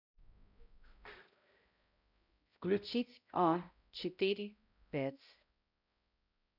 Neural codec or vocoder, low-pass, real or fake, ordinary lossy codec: codec, 16 kHz, 0.5 kbps, X-Codec, HuBERT features, trained on balanced general audio; 5.4 kHz; fake; none